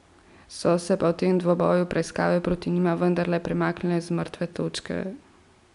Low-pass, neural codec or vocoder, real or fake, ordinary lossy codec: 10.8 kHz; none; real; none